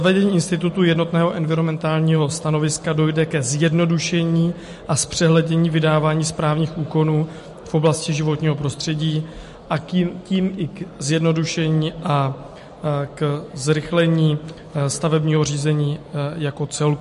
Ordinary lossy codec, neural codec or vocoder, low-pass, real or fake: MP3, 48 kbps; none; 14.4 kHz; real